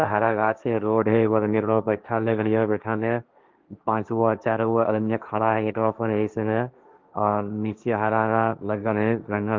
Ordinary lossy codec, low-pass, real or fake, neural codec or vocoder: Opus, 32 kbps; 7.2 kHz; fake; codec, 16 kHz, 1.1 kbps, Voila-Tokenizer